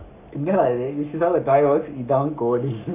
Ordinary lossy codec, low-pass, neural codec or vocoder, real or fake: none; 3.6 kHz; codec, 44.1 kHz, 7.8 kbps, Pupu-Codec; fake